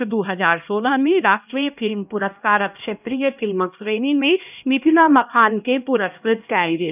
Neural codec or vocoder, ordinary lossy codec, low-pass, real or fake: codec, 16 kHz, 1 kbps, X-Codec, HuBERT features, trained on LibriSpeech; none; 3.6 kHz; fake